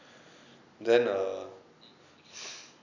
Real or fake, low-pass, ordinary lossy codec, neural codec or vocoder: real; 7.2 kHz; none; none